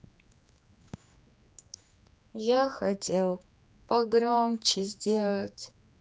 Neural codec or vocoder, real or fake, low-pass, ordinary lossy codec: codec, 16 kHz, 2 kbps, X-Codec, HuBERT features, trained on general audio; fake; none; none